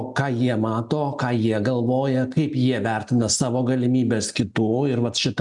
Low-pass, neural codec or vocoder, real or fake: 10.8 kHz; none; real